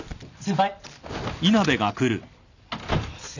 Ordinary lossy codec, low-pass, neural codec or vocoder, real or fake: none; 7.2 kHz; none; real